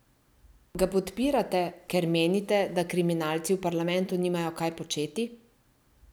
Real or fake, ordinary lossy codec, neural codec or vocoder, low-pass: real; none; none; none